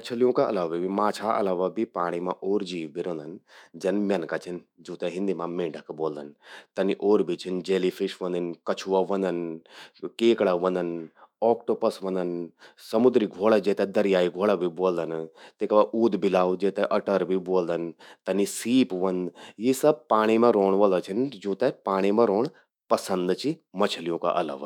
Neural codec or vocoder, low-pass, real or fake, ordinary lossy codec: autoencoder, 48 kHz, 128 numbers a frame, DAC-VAE, trained on Japanese speech; 19.8 kHz; fake; none